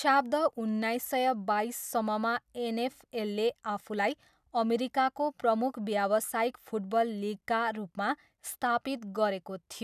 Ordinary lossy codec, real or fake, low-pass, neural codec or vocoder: none; real; 14.4 kHz; none